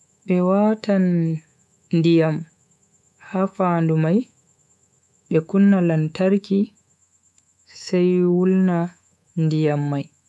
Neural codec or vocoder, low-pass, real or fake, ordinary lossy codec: codec, 24 kHz, 3.1 kbps, DualCodec; none; fake; none